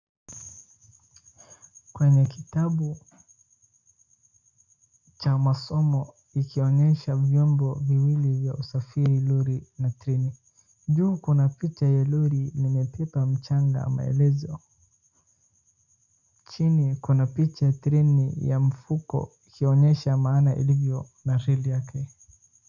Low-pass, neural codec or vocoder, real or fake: 7.2 kHz; none; real